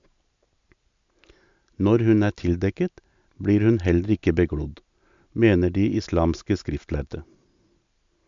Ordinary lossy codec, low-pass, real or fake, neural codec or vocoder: MP3, 64 kbps; 7.2 kHz; real; none